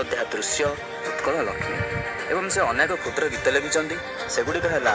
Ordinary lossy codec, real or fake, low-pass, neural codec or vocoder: none; real; none; none